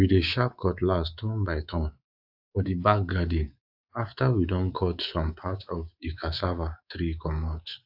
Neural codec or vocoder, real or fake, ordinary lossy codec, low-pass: codec, 24 kHz, 3.1 kbps, DualCodec; fake; AAC, 48 kbps; 5.4 kHz